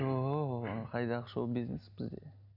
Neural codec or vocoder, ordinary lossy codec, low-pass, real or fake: none; none; 5.4 kHz; real